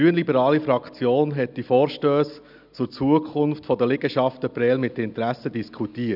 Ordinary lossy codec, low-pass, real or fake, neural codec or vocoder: none; 5.4 kHz; real; none